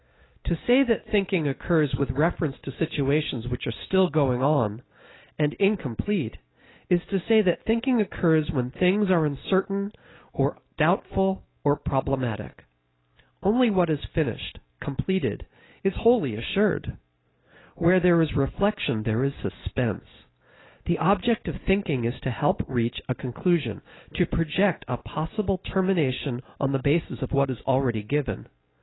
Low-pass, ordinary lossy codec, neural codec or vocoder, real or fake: 7.2 kHz; AAC, 16 kbps; none; real